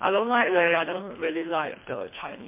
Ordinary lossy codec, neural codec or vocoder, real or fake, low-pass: MP3, 24 kbps; codec, 24 kHz, 1.5 kbps, HILCodec; fake; 3.6 kHz